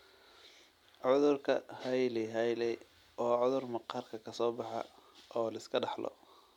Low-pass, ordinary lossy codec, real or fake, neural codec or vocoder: 19.8 kHz; MP3, 96 kbps; real; none